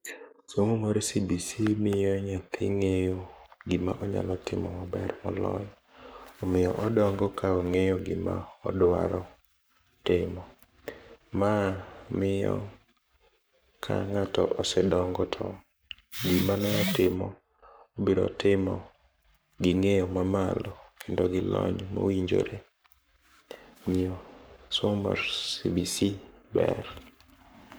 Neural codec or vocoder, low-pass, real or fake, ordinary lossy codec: codec, 44.1 kHz, 7.8 kbps, Pupu-Codec; none; fake; none